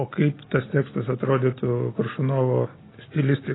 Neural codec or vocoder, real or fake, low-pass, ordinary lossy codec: none; real; 7.2 kHz; AAC, 16 kbps